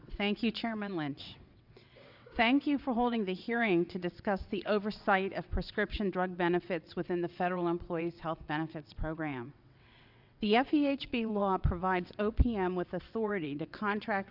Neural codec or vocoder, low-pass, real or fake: vocoder, 22.05 kHz, 80 mel bands, WaveNeXt; 5.4 kHz; fake